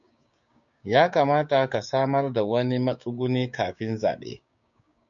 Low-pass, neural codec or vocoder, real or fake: 7.2 kHz; codec, 16 kHz, 6 kbps, DAC; fake